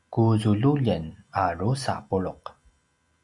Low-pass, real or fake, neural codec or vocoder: 10.8 kHz; real; none